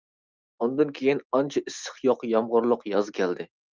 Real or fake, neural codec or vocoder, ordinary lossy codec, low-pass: real; none; Opus, 24 kbps; 7.2 kHz